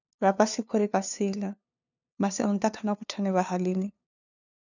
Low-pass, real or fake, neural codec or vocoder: 7.2 kHz; fake; codec, 16 kHz, 2 kbps, FunCodec, trained on LibriTTS, 25 frames a second